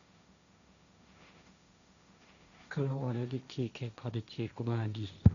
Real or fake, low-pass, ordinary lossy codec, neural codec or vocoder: fake; 7.2 kHz; none; codec, 16 kHz, 1.1 kbps, Voila-Tokenizer